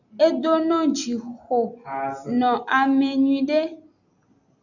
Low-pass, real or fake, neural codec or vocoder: 7.2 kHz; real; none